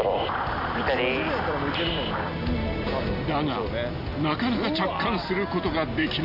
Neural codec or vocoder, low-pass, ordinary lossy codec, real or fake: none; 5.4 kHz; none; real